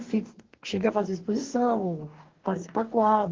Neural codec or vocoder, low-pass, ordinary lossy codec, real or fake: codec, 44.1 kHz, 2.6 kbps, DAC; 7.2 kHz; Opus, 16 kbps; fake